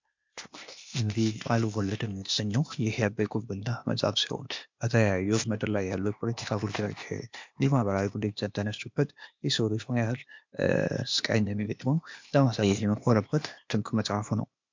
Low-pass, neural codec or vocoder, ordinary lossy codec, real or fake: 7.2 kHz; codec, 16 kHz, 0.8 kbps, ZipCodec; MP3, 64 kbps; fake